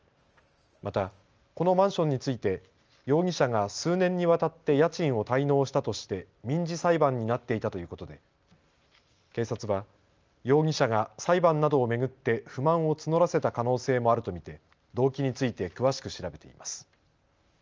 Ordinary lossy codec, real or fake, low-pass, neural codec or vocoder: Opus, 24 kbps; real; 7.2 kHz; none